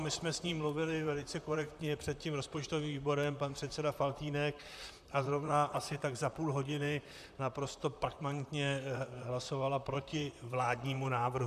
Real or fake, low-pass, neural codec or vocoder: fake; 14.4 kHz; vocoder, 44.1 kHz, 128 mel bands, Pupu-Vocoder